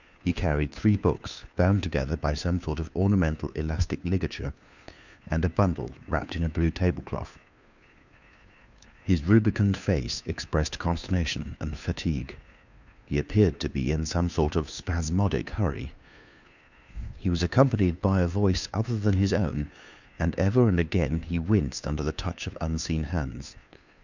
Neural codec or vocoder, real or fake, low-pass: codec, 16 kHz, 2 kbps, FunCodec, trained on Chinese and English, 25 frames a second; fake; 7.2 kHz